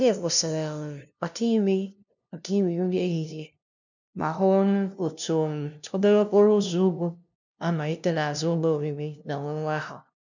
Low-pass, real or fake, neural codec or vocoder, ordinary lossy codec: 7.2 kHz; fake; codec, 16 kHz, 0.5 kbps, FunCodec, trained on LibriTTS, 25 frames a second; none